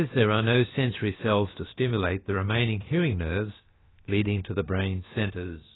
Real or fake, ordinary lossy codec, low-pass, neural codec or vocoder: fake; AAC, 16 kbps; 7.2 kHz; codec, 16 kHz, about 1 kbps, DyCAST, with the encoder's durations